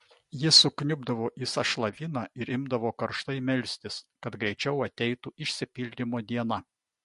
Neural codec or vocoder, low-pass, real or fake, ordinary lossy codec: none; 14.4 kHz; real; MP3, 48 kbps